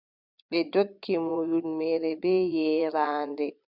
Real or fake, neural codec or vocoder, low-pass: fake; vocoder, 22.05 kHz, 80 mel bands, Vocos; 5.4 kHz